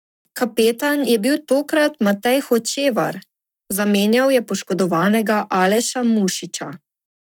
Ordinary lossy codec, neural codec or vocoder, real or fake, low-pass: none; codec, 44.1 kHz, 7.8 kbps, Pupu-Codec; fake; 19.8 kHz